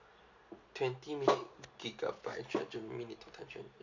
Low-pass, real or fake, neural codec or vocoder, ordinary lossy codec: 7.2 kHz; real; none; Opus, 64 kbps